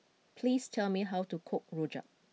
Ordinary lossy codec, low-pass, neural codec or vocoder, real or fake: none; none; none; real